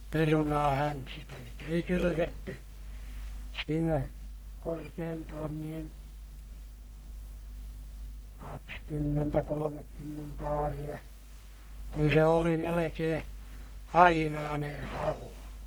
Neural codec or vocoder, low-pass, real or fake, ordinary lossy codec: codec, 44.1 kHz, 1.7 kbps, Pupu-Codec; none; fake; none